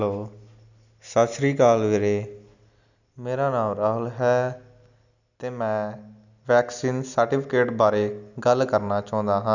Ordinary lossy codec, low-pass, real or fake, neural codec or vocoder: none; 7.2 kHz; real; none